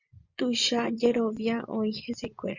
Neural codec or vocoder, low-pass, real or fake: vocoder, 24 kHz, 100 mel bands, Vocos; 7.2 kHz; fake